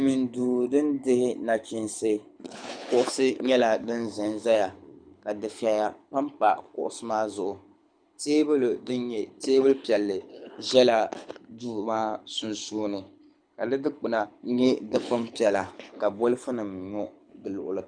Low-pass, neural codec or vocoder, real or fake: 9.9 kHz; codec, 24 kHz, 6 kbps, HILCodec; fake